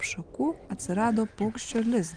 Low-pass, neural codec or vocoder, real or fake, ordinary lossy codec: 9.9 kHz; none; real; Opus, 24 kbps